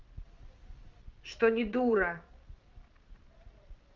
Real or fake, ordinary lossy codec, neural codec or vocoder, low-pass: fake; Opus, 32 kbps; vocoder, 22.05 kHz, 80 mel bands, Vocos; 7.2 kHz